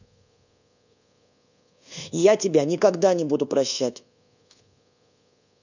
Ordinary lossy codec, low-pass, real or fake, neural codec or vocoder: none; 7.2 kHz; fake; codec, 24 kHz, 1.2 kbps, DualCodec